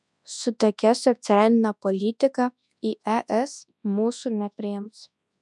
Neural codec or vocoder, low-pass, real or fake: codec, 24 kHz, 0.9 kbps, WavTokenizer, large speech release; 10.8 kHz; fake